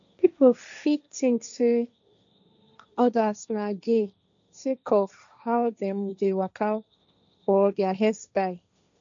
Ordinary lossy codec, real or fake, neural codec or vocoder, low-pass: none; fake; codec, 16 kHz, 1.1 kbps, Voila-Tokenizer; 7.2 kHz